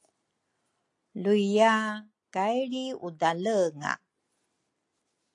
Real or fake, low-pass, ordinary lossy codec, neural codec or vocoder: real; 10.8 kHz; AAC, 48 kbps; none